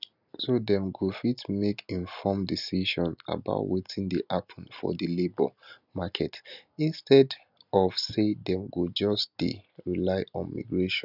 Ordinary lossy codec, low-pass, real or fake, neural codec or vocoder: none; 5.4 kHz; real; none